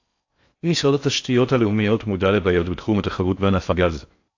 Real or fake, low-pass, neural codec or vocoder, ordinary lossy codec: fake; 7.2 kHz; codec, 16 kHz in and 24 kHz out, 0.6 kbps, FocalCodec, streaming, 4096 codes; AAC, 48 kbps